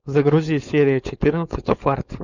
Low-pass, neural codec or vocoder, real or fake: 7.2 kHz; codec, 16 kHz, 4.8 kbps, FACodec; fake